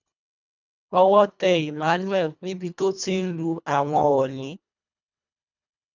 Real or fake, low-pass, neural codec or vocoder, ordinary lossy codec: fake; 7.2 kHz; codec, 24 kHz, 1.5 kbps, HILCodec; none